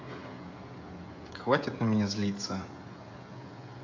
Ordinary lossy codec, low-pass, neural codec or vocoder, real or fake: AAC, 48 kbps; 7.2 kHz; codec, 16 kHz, 16 kbps, FreqCodec, smaller model; fake